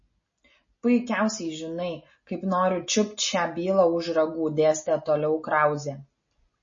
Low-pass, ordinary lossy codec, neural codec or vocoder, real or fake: 7.2 kHz; MP3, 32 kbps; none; real